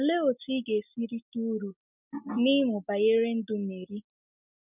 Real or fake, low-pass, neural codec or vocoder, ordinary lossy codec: real; 3.6 kHz; none; none